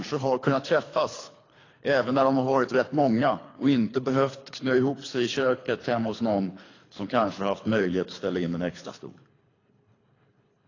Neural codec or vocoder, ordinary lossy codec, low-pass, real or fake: codec, 24 kHz, 3 kbps, HILCodec; AAC, 32 kbps; 7.2 kHz; fake